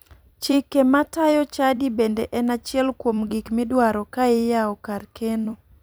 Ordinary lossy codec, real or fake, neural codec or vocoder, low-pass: none; fake; vocoder, 44.1 kHz, 128 mel bands every 256 samples, BigVGAN v2; none